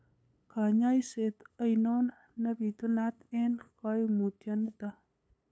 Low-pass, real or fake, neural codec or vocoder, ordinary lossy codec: none; fake; codec, 16 kHz, 8 kbps, FunCodec, trained on LibriTTS, 25 frames a second; none